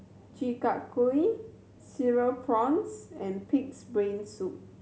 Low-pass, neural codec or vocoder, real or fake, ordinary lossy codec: none; none; real; none